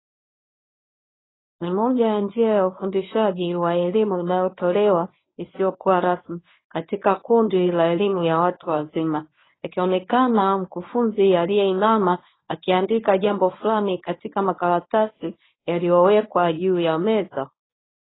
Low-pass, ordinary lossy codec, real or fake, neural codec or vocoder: 7.2 kHz; AAC, 16 kbps; fake; codec, 24 kHz, 0.9 kbps, WavTokenizer, medium speech release version 1